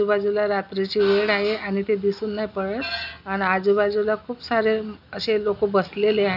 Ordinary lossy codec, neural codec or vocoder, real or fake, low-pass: none; none; real; 5.4 kHz